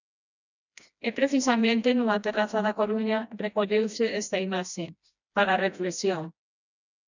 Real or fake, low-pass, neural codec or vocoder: fake; 7.2 kHz; codec, 16 kHz, 1 kbps, FreqCodec, smaller model